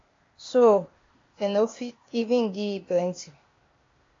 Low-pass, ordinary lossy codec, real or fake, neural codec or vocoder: 7.2 kHz; AAC, 32 kbps; fake; codec, 16 kHz, 0.8 kbps, ZipCodec